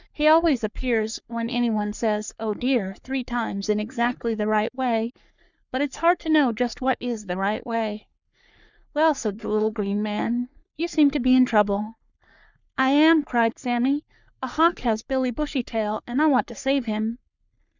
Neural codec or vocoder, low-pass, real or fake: codec, 44.1 kHz, 3.4 kbps, Pupu-Codec; 7.2 kHz; fake